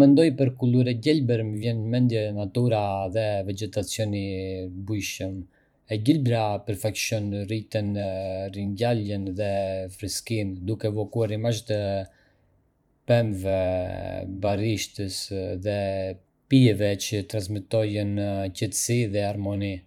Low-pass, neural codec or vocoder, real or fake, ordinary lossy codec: 19.8 kHz; vocoder, 44.1 kHz, 128 mel bands every 512 samples, BigVGAN v2; fake; none